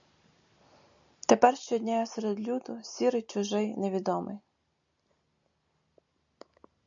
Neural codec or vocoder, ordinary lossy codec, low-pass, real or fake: none; AAC, 64 kbps; 7.2 kHz; real